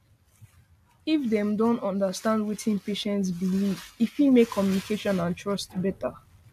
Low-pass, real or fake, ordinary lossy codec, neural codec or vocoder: 14.4 kHz; real; AAC, 96 kbps; none